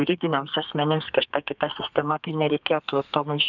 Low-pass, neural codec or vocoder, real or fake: 7.2 kHz; codec, 32 kHz, 1.9 kbps, SNAC; fake